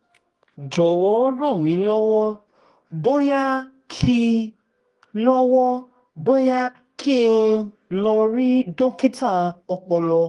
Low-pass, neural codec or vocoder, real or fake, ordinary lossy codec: 10.8 kHz; codec, 24 kHz, 0.9 kbps, WavTokenizer, medium music audio release; fake; Opus, 24 kbps